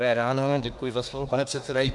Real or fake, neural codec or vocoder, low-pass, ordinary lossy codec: fake; codec, 24 kHz, 1 kbps, SNAC; 10.8 kHz; MP3, 96 kbps